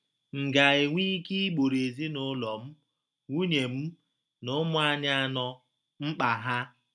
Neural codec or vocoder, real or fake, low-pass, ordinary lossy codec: none; real; none; none